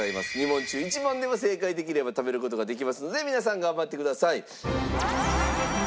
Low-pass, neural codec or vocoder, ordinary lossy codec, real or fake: none; none; none; real